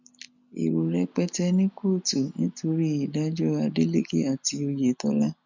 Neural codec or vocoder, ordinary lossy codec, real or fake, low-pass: none; none; real; 7.2 kHz